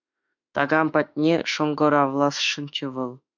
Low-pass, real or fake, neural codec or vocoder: 7.2 kHz; fake; autoencoder, 48 kHz, 32 numbers a frame, DAC-VAE, trained on Japanese speech